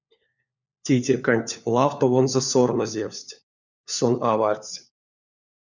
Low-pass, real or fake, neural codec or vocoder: 7.2 kHz; fake; codec, 16 kHz, 4 kbps, FunCodec, trained on LibriTTS, 50 frames a second